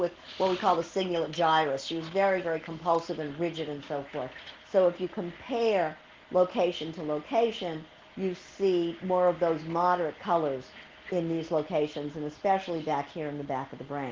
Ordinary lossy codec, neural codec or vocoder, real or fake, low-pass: Opus, 16 kbps; none; real; 7.2 kHz